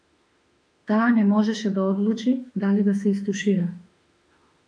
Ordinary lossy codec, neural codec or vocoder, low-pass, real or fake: MP3, 64 kbps; autoencoder, 48 kHz, 32 numbers a frame, DAC-VAE, trained on Japanese speech; 9.9 kHz; fake